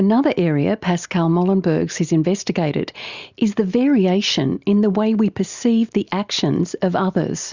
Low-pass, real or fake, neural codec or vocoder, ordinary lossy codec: 7.2 kHz; real; none; Opus, 64 kbps